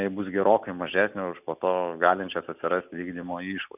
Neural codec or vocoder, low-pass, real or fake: none; 3.6 kHz; real